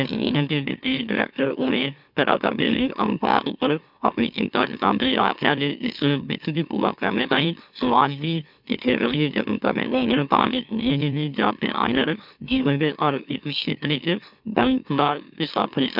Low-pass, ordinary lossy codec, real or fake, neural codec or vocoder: 5.4 kHz; none; fake; autoencoder, 44.1 kHz, a latent of 192 numbers a frame, MeloTTS